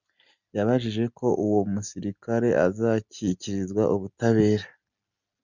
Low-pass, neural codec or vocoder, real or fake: 7.2 kHz; none; real